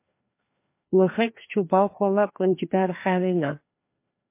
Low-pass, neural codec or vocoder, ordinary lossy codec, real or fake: 3.6 kHz; codec, 16 kHz, 2 kbps, FreqCodec, larger model; MP3, 24 kbps; fake